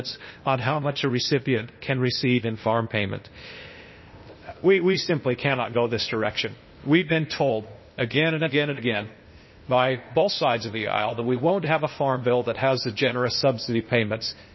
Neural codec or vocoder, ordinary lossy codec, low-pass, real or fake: codec, 16 kHz, 0.8 kbps, ZipCodec; MP3, 24 kbps; 7.2 kHz; fake